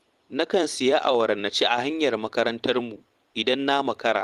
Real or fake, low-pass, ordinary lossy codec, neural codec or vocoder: fake; 14.4 kHz; Opus, 24 kbps; vocoder, 44.1 kHz, 128 mel bands every 256 samples, BigVGAN v2